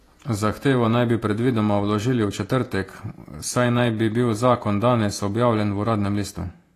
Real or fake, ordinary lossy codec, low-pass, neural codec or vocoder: fake; AAC, 48 kbps; 14.4 kHz; vocoder, 48 kHz, 128 mel bands, Vocos